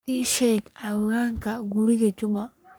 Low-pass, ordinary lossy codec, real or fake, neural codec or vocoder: none; none; fake; codec, 44.1 kHz, 3.4 kbps, Pupu-Codec